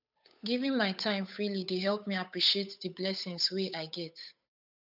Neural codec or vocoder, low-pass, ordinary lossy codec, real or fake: codec, 16 kHz, 8 kbps, FunCodec, trained on Chinese and English, 25 frames a second; 5.4 kHz; none; fake